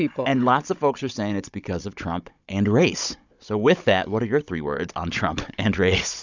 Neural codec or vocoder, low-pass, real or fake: codec, 16 kHz, 16 kbps, FunCodec, trained on Chinese and English, 50 frames a second; 7.2 kHz; fake